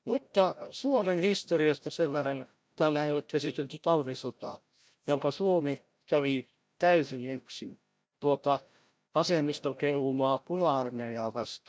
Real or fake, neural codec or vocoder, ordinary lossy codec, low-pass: fake; codec, 16 kHz, 0.5 kbps, FreqCodec, larger model; none; none